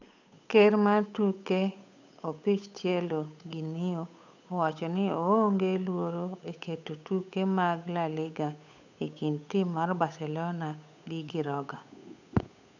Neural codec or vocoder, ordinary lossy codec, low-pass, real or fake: codec, 16 kHz, 8 kbps, FunCodec, trained on Chinese and English, 25 frames a second; none; 7.2 kHz; fake